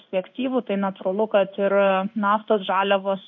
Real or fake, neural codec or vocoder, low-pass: fake; codec, 16 kHz in and 24 kHz out, 1 kbps, XY-Tokenizer; 7.2 kHz